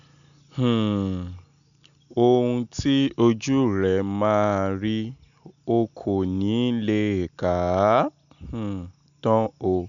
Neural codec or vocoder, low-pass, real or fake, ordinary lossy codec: none; 7.2 kHz; real; none